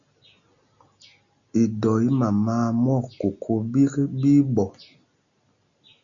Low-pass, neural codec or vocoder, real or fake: 7.2 kHz; none; real